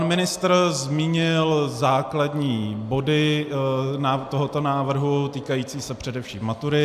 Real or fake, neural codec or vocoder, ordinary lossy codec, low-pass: real; none; AAC, 96 kbps; 14.4 kHz